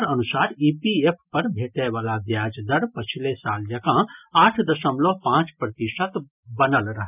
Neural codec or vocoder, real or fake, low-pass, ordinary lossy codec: none; real; 3.6 kHz; none